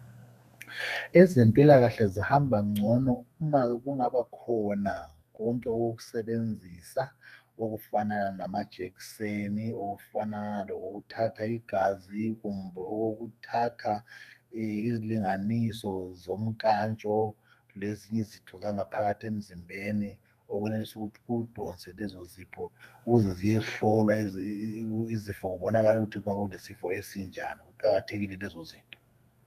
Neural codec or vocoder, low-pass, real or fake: codec, 32 kHz, 1.9 kbps, SNAC; 14.4 kHz; fake